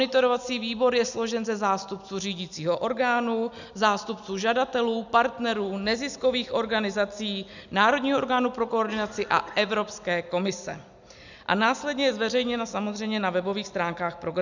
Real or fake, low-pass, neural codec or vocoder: real; 7.2 kHz; none